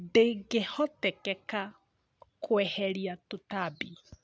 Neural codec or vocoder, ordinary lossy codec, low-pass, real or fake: none; none; none; real